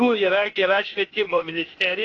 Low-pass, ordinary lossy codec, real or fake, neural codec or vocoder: 7.2 kHz; AAC, 32 kbps; fake; codec, 16 kHz, 0.8 kbps, ZipCodec